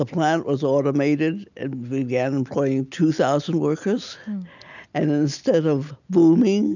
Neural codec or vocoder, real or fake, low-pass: none; real; 7.2 kHz